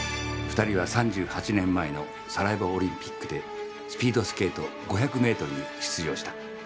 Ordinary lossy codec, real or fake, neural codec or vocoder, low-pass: none; real; none; none